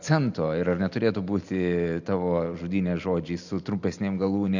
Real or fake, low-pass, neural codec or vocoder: real; 7.2 kHz; none